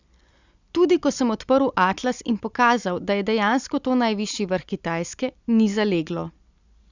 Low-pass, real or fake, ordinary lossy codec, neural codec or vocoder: 7.2 kHz; real; Opus, 64 kbps; none